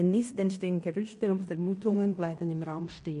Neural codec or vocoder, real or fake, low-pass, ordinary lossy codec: codec, 16 kHz in and 24 kHz out, 0.9 kbps, LongCat-Audio-Codec, fine tuned four codebook decoder; fake; 10.8 kHz; MP3, 48 kbps